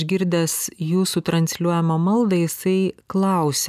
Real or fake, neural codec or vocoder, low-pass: real; none; 14.4 kHz